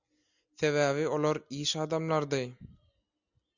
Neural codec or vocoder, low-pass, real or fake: none; 7.2 kHz; real